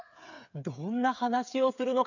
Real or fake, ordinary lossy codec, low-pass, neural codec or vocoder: fake; none; 7.2 kHz; codec, 16 kHz, 8 kbps, FreqCodec, smaller model